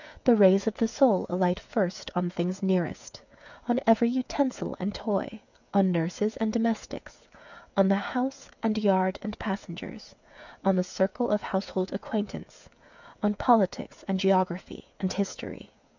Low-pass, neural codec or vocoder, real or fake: 7.2 kHz; codec, 16 kHz, 8 kbps, FreqCodec, smaller model; fake